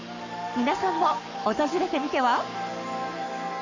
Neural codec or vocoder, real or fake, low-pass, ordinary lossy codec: codec, 44.1 kHz, 7.8 kbps, Pupu-Codec; fake; 7.2 kHz; none